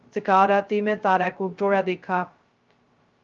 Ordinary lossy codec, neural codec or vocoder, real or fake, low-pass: Opus, 32 kbps; codec, 16 kHz, 0.2 kbps, FocalCodec; fake; 7.2 kHz